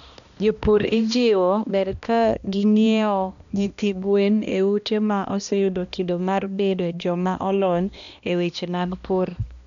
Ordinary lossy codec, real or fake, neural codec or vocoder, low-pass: none; fake; codec, 16 kHz, 1 kbps, X-Codec, HuBERT features, trained on balanced general audio; 7.2 kHz